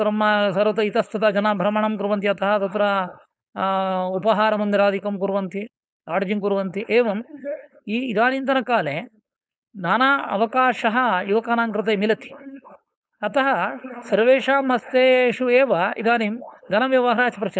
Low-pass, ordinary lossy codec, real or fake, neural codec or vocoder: none; none; fake; codec, 16 kHz, 4.8 kbps, FACodec